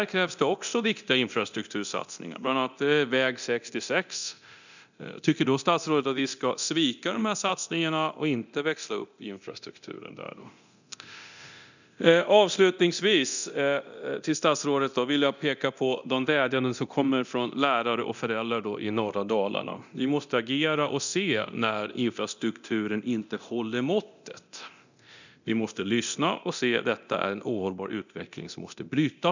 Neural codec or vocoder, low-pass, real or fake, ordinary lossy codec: codec, 24 kHz, 0.9 kbps, DualCodec; 7.2 kHz; fake; none